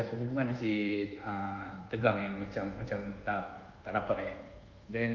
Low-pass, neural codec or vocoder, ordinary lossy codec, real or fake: 7.2 kHz; codec, 24 kHz, 1.2 kbps, DualCodec; Opus, 24 kbps; fake